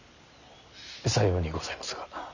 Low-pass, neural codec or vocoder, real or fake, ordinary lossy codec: 7.2 kHz; none; real; none